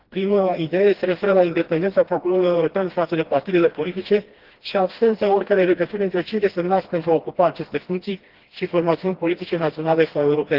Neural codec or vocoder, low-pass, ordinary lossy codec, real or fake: codec, 16 kHz, 1 kbps, FreqCodec, smaller model; 5.4 kHz; Opus, 16 kbps; fake